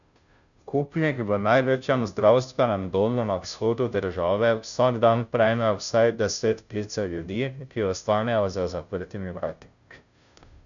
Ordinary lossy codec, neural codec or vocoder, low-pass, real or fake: none; codec, 16 kHz, 0.5 kbps, FunCodec, trained on Chinese and English, 25 frames a second; 7.2 kHz; fake